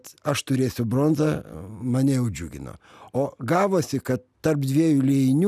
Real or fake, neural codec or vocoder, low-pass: real; none; 14.4 kHz